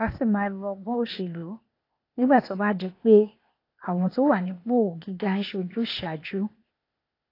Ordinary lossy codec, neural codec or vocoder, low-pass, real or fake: AAC, 32 kbps; codec, 16 kHz, 0.8 kbps, ZipCodec; 5.4 kHz; fake